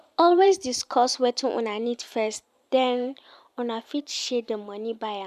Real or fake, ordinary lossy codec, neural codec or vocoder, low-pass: fake; none; vocoder, 44.1 kHz, 128 mel bands every 512 samples, BigVGAN v2; 14.4 kHz